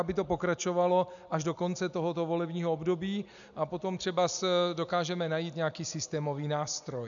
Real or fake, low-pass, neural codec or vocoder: real; 7.2 kHz; none